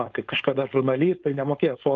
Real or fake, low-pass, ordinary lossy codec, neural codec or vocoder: fake; 7.2 kHz; Opus, 32 kbps; codec, 16 kHz, 4.8 kbps, FACodec